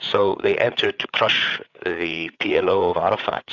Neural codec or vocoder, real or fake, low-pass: codec, 16 kHz, 8 kbps, FreqCodec, larger model; fake; 7.2 kHz